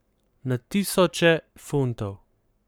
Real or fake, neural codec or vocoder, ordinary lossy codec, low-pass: fake; codec, 44.1 kHz, 7.8 kbps, Pupu-Codec; none; none